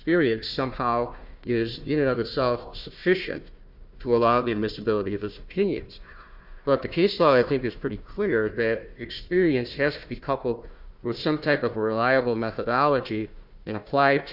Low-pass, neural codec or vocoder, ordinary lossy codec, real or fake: 5.4 kHz; codec, 16 kHz, 1 kbps, FunCodec, trained on Chinese and English, 50 frames a second; Opus, 64 kbps; fake